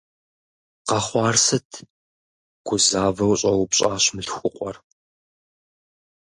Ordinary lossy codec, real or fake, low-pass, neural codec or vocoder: MP3, 48 kbps; real; 10.8 kHz; none